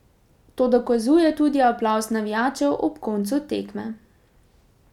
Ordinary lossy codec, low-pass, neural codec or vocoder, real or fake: none; 19.8 kHz; none; real